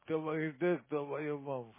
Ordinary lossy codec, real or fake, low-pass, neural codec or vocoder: MP3, 16 kbps; real; 3.6 kHz; none